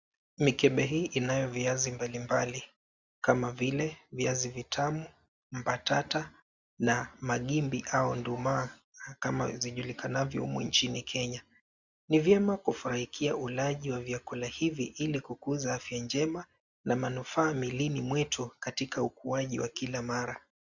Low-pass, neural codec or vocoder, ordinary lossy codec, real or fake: 7.2 kHz; none; Opus, 64 kbps; real